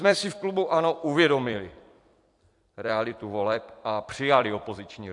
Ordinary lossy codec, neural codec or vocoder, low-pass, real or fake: AAC, 64 kbps; vocoder, 44.1 kHz, 128 mel bands every 256 samples, BigVGAN v2; 10.8 kHz; fake